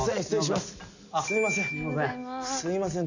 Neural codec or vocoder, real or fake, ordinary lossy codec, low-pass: none; real; none; 7.2 kHz